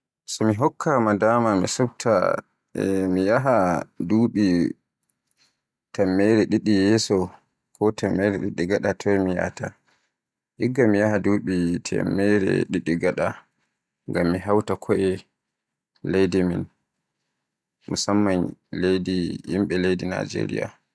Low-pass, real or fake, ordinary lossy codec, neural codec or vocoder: none; real; none; none